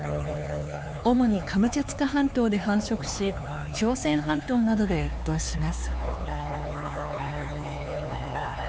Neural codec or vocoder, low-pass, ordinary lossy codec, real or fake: codec, 16 kHz, 4 kbps, X-Codec, HuBERT features, trained on LibriSpeech; none; none; fake